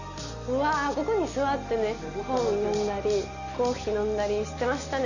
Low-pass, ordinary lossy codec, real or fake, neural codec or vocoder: 7.2 kHz; none; real; none